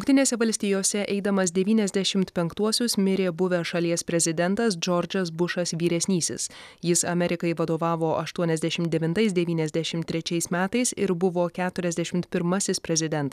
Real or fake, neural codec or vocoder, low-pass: real; none; 14.4 kHz